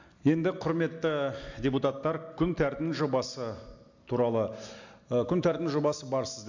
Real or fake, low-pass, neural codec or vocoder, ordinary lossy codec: real; 7.2 kHz; none; AAC, 48 kbps